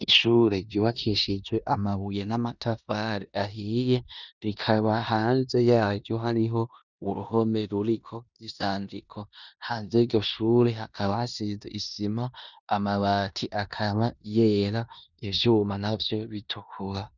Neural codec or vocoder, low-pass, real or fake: codec, 16 kHz in and 24 kHz out, 0.9 kbps, LongCat-Audio-Codec, four codebook decoder; 7.2 kHz; fake